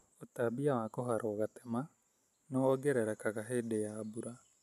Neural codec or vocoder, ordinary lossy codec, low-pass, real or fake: none; none; 14.4 kHz; real